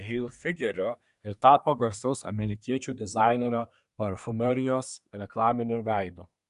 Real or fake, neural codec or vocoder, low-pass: fake; codec, 24 kHz, 1 kbps, SNAC; 10.8 kHz